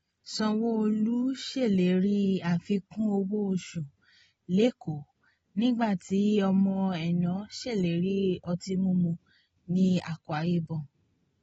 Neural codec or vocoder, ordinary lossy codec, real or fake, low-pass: none; AAC, 24 kbps; real; 19.8 kHz